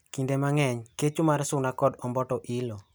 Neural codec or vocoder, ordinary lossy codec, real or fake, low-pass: none; none; real; none